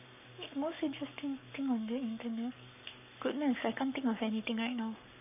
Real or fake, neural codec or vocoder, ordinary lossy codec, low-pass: fake; codec, 44.1 kHz, 7.8 kbps, Pupu-Codec; none; 3.6 kHz